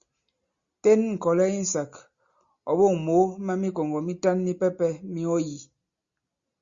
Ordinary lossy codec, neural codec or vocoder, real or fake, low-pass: Opus, 64 kbps; none; real; 7.2 kHz